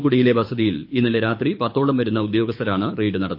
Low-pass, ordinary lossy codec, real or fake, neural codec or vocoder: 5.4 kHz; MP3, 32 kbps; fake; codec, 24 kHz, 6 kbps, HILCodec